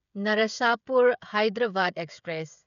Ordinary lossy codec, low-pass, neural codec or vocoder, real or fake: none; 7.2 kHz; codec, 16 kHz, 16 kbps, FreqCodec, smaller model; fake